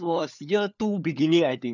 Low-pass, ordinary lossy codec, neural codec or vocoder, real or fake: 7.2 kHz; none; codec, 16 kHz, 4 kbps, FunCodec, trained on LibriTTS, 50 frames a second; fake